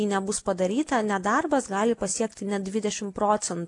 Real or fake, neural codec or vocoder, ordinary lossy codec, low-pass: real; none; AAC, 48 kbps; 10.8 kHz